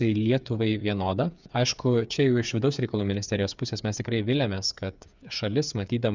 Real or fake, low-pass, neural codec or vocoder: fake; 7.2 kHz; codec, 16 kHz, 8 kbps, FreqCodec, smaller model